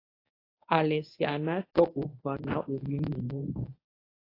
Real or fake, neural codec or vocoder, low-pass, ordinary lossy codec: fake; codec, 24 kHz, 0.9 kbps, WavTokenizer, medium speech release version 1; 5.4 kHz; AAC, 24 kbps